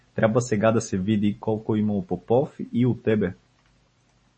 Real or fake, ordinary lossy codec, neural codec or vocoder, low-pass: real; MP3, 32 kbps; none; 9.9 kHz